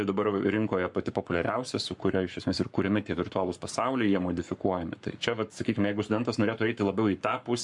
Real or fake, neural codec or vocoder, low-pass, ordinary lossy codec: fake; codec, 44.1 kHz, 7.8 kbps, Pupu-Codec; 10.8 kHz; MP3, 64 kbps